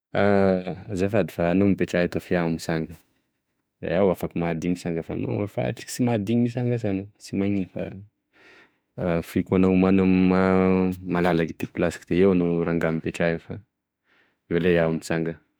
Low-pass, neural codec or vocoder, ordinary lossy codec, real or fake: none; autoencoder, 48 kHz, 32 numbers a frame, DAC-VAE, trained on Japanese speech; none; fake